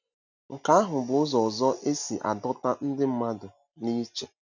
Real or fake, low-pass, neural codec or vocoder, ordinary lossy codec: real; 7.2 kHz; none; none